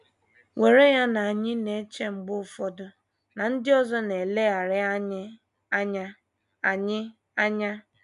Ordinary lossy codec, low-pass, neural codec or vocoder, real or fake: none; 10.8 kHz; none; real